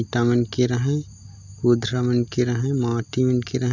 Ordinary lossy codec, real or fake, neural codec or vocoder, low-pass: none; real; none; 7.2 kHz